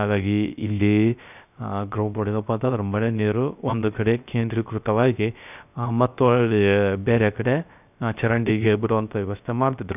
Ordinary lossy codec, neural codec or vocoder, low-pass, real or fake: none; codec, 16 kHz, 0.3 kbps, FocalCodec; 3.6 kHz; fake